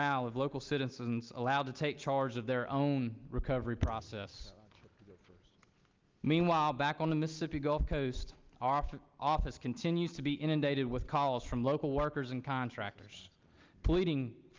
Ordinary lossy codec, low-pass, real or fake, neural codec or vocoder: Opus, 24 kbps; 7.2 kHz; real; none